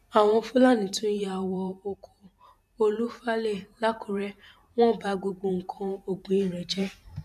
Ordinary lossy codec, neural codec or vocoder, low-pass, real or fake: none; vocoder, 44.1 kHz, 128 mel bands every 512 samples, BigVGAN v2; 14.4 kHz; fake